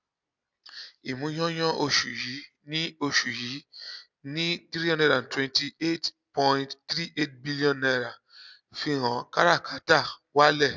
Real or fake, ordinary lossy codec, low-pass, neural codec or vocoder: real; none; 7.2 kHz; none